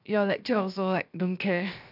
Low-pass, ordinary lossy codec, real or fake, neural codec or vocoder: 5.4 kHz; none; fake; codec, 16 kHz, 0.7 kbps, FocalCodec